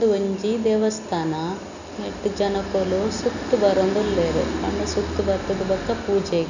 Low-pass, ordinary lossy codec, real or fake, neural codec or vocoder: 7.2 kHz; none; real; none